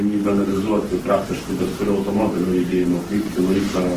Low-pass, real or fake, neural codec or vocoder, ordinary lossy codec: 14.4 kHz; fake; codec, 44.1 kHz, 7.8 kbps, Pupu-Codec; Opus, 16 kbps